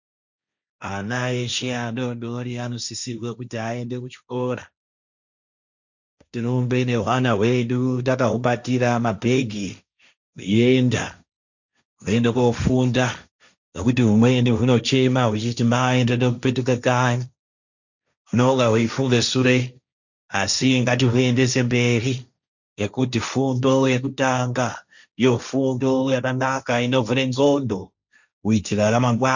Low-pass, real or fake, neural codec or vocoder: 7.2 kHz; fake; codec, 16 kHz, 1.1 kbps, Voila-Tokenizer